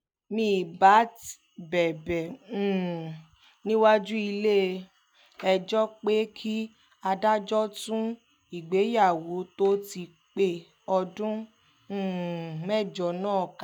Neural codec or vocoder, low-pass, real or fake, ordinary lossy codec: none; none; real; none